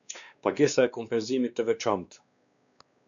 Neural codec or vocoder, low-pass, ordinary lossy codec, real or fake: codec, 16 kHz, 2 kbps, X-Codec, WavLM features, trained on Multilingual LibriSpeech; 7.2 kHz; MP3, 96 kbps; fake